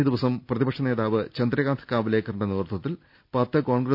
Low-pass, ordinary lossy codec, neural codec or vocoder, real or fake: 5.4 kHz; none; none; real